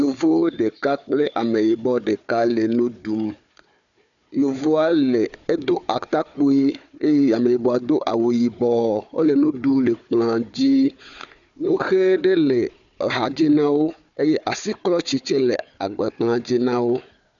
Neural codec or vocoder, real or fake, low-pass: codec, 16 kHz, 4 kbps, FunCodec, trained on Chinese and English, 50 frames a second; fake; 7.2 kHz